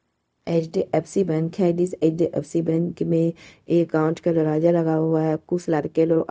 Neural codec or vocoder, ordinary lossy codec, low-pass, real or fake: codec, 16 kHz, 0.4 kbps, LongCat-Audio-Codec; none; none; fake